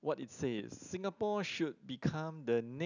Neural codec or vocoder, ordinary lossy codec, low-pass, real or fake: none; none; 7.2 kHz; real